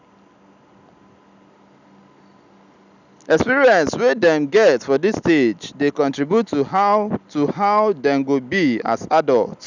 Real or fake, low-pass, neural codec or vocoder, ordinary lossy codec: real; 7.2 kHz; none; none